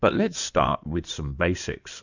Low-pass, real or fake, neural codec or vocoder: 7.2 kHz; fake; codec, 16 kHz in and 24 kHz out, 2.2 kbps, FireRedTTS-2 codec